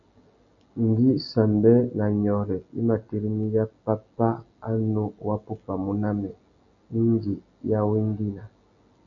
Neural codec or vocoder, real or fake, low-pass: none; real; 7.2 kHz